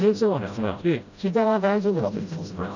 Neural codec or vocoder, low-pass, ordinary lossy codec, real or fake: codec, 16 kHz, 0.5 kbps, FreqCodec, smaller model; 7.2 kHz; none; fake